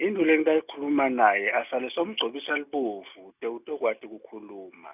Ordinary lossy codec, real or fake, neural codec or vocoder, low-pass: none; real; none; 3.6 kHz